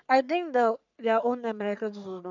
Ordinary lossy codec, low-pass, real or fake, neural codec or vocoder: none; 7.2 kHz; fake; codec, 44.1 kHz, 3.4 kbps, Pupu-Codec